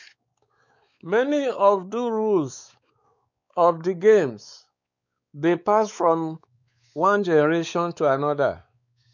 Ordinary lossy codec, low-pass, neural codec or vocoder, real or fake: none; 7.2 kHz; codec, 16 kHz, 4 kbps, X-Codec, WavLM features, trained on Multilingual LibriSpeech; fake